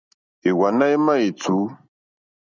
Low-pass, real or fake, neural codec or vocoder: 7.2 kHz; real; none